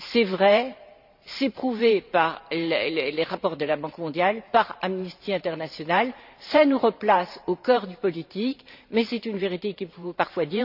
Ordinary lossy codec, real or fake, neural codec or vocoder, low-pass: none; fake; vocoder, 44.1 kHz, 128 mel bands every 512 samples, BigVGAN v2; 5.4 kHz